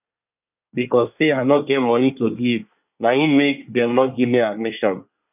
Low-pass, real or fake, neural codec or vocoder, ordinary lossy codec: 3.6 kHz; fake; codec, 24 kHz, 1 kbps, SNAC; none